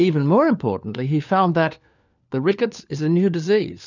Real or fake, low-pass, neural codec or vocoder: fake; 7.2 kHz; codec, 16 kHz, 4 kbps, FunCodec, trained on LibriTTS, 50 frames a second